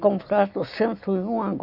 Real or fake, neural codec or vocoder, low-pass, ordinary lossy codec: real; none; 5.4 kHz; AAC, 48 kbps